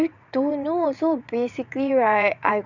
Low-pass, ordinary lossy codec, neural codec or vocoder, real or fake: 7.2 kHz; none; vocoder, 22.05 kHz, 80 mel bands, WaveNeXt; fake